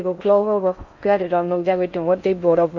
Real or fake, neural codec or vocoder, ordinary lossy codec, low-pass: fake; codec, 16 kHz in and 24 kHz out, 0.6 kbps, FocalCodec, streaming, 4096 codes; none; 7.2 kHz